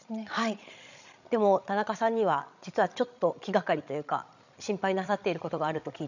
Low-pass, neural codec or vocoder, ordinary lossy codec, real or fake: 7.2 kHz; codec, 16 kHz, 16 kbps, FreqCodec, larger model; none; fake